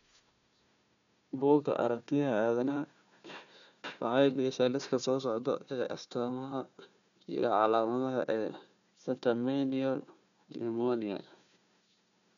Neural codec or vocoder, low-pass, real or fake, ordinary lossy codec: codec, 16 kHz, 1 kbps, FunCodec, trained on Chinese and English, 50 frames a second; 7.2 kHz; fake; none